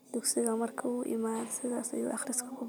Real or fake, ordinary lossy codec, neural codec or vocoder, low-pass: real; none; none; none